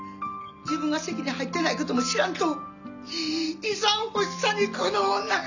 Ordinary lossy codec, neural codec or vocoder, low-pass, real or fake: none; none; 7.2 kHz; real